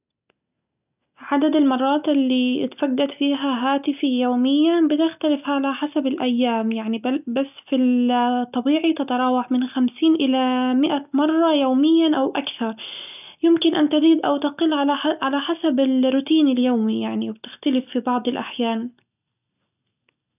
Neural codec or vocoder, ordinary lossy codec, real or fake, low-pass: none; none; real; 3.6 kHz